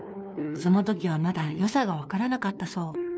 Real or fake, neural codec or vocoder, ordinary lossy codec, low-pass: fake; codec, 16 kHz, 2 kbps, FunCodec, trained on LibriTTS, 25 frames a second; none; none